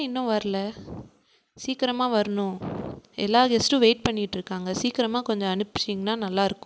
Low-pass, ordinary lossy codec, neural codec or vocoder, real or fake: none; none; none; real